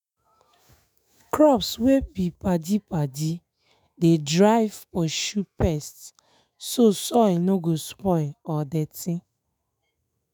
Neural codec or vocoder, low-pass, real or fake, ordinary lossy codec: autoencoder, 48 kHz, 128 numbers a frame, DAC-VAE, trained on Japanese speech; none; fake; none